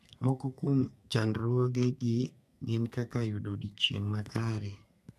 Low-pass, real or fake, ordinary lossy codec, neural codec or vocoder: 14.4 kHz; fake; none; codec, 32 kHz, 1.9 kbps, SNAC